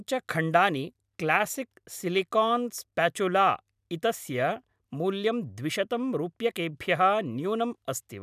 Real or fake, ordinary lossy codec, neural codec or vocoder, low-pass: real; none; none; 14.4 kHz